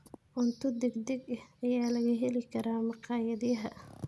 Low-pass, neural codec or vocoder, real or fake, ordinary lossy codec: none; none; real; none